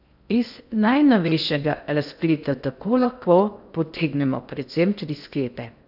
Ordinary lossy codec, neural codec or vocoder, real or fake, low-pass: none; codec, 16 kHz in and 24 kHz out, 0.6 kbps, FocalCodec, streaming, 2048 codes; fake; 5.4 kHz